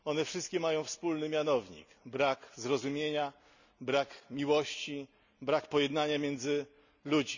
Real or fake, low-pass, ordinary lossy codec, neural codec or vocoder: real; 7.2 kHz; none; none